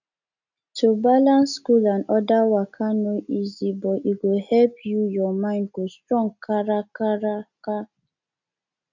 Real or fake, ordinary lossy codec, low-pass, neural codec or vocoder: real; none; 7.2 kHz; none